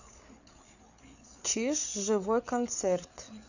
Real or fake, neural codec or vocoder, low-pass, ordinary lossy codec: fake; codec, 16 kHz, 8 kbps, FreqCodec, larger model; 7.2 kHz; none